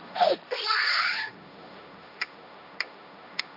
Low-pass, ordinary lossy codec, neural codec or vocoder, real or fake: 5.4 kHz; none; codec, 16 kHz, 1.1 kbps, Voila-Tokenizer; fake